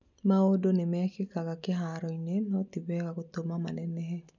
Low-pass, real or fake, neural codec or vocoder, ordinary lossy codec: 7.2 kHz; real; none; none